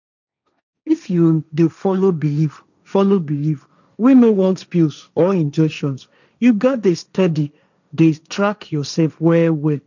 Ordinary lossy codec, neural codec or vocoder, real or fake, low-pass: none; codec, 16 kHz, 1.1 kbps, Voila-Tokenizer; fake; 7.2 kHz